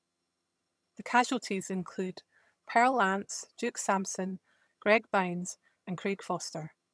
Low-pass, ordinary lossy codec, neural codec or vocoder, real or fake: none; none; vocoder, 22.05 kHz, 80 mel bands, HiFi-GAN; fake